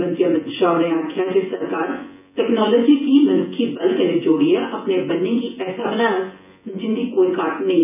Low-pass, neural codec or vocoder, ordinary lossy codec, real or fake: 3.6 kHz; vocoder, 24 kHz, 100 mel bands, Vocos; none; fake